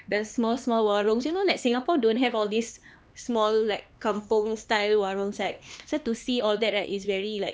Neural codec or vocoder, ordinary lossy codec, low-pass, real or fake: codec, 16 kHz, 2 kbps, X-Codec, HuBERT features, trained on LibriSpeech; none; none; fake